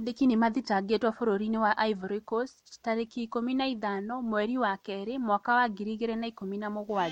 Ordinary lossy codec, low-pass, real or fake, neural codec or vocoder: MP3, 64 kbps; 19.8 kHz; real; none